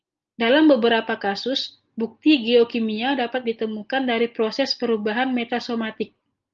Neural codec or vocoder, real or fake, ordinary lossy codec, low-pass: none; real; Opus, 24 kbps; 7.2 kHz